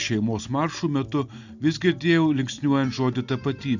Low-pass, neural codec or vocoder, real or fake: 7.2 kHz; none; real